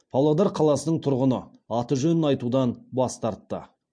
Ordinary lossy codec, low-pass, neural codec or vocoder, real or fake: MP3, 48 kbps; 9.9 kHz; none; real